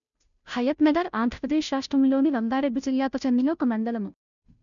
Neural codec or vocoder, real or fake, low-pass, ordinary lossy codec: codec, 16 kHz, 0.5 kbps, FunCodec, trained on Chinese and English, 25 frames a second; fake; 7.2 kHz; none